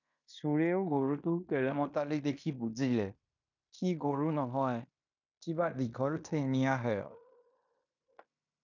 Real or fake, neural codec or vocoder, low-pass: fake; codec, 16 kHz in and 24 kHz out, 0.9 kbps, LongCat-Audio-Codec, fine tuned four codebook decoder; 7.2 kHz